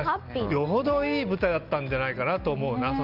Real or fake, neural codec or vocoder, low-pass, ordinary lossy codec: real; none; 5.4 kHz; Opus, 32 kbps